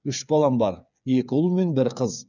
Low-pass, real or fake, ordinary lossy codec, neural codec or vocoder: 7.2 kHz; fake; none; codec, 16 kHz, 8 kbps, FreqCodec, smaller model